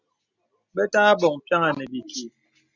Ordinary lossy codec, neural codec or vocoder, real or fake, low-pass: Opus, 64 kbps; none; real; 7.2 kHz